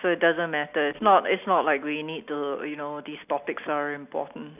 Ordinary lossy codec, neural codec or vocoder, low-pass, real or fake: none; none; 3.6 kHz; real